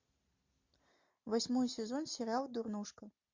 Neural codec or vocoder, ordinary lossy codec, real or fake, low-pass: none; MP3, 48 kbps; real; 7.2 kHz